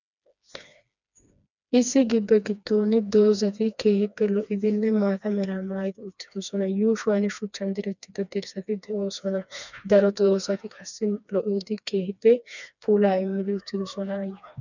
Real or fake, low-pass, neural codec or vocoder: fake; 7.2 kHz; codec, 16 kHz, 2 kbps, FreqCodec, smaller model